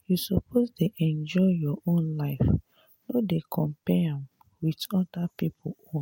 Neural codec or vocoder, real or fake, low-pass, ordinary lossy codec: none; real; 19.8 kHz; MP3, 64 kbps